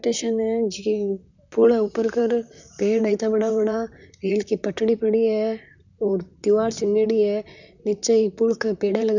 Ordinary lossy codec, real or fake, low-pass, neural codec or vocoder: none; fake; 7.2 kHz; vocoder, 44.1 kHz, 128 mel bands, Pupu-Vocoder